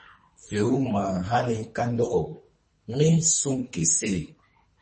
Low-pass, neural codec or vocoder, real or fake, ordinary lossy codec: 10.8 kHz; codec, 24 kHz, 3 kbps, HILCodec; fake; MP3, 32 kbps